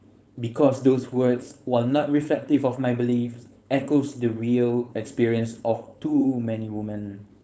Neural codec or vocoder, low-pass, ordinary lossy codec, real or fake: codec, 16 kHz, 4.8 kbps, FACodec; none; none; fake